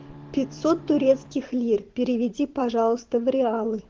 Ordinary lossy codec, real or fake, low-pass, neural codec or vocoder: Opus, 16 kbps; fake; 7.2 kHz; vocoder, 44.1 kHz, 128 mel bands every 512 samples, BigVGAN v2